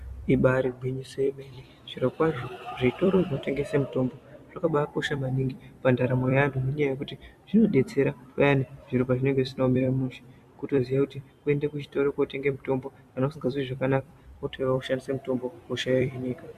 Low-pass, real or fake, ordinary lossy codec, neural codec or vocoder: 14.4 kHz; fake; Opus, 64 kbps; vocoder, 44.1 kHz, 128 mel bands every 256 samples, BigVGAN v2